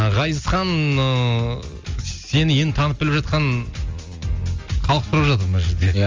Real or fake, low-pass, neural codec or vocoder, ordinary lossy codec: real; 7.2 kHz; none; Opus, 32 kbps